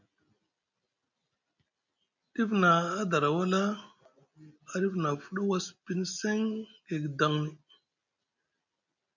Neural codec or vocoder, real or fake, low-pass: none; real; 7.2 kHz